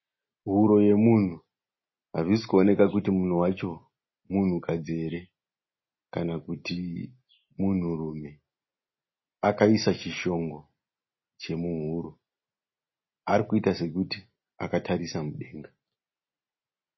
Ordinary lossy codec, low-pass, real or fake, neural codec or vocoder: MP3, 24 kbps; 7.2 kHz; real; none